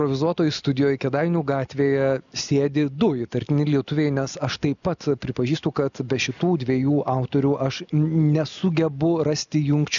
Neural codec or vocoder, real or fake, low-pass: none; real; 7.2 kHz